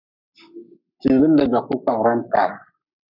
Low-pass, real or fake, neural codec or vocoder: 5.4 kHz; fake; codec, 44.1 kHz, 7.8 kbps, Pupu-Codec